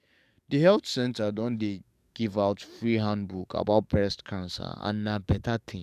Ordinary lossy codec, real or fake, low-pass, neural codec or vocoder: none; fake; 14.4 kHz; codec, 44.1 kHz, 7.8 kbps, DAC